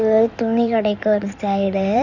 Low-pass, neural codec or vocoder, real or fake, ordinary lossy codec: 7.2 kHz; codec, 16 kHz in and 24 kHz out, 2.2 kbps, FireRedTTS-2 codec; fake; none